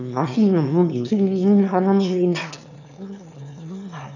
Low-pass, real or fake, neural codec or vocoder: 7.2 kHz; fake; autoencoder, 22.05 kHz, a latent of 192 numbers a frame, VITS, trained on one speaker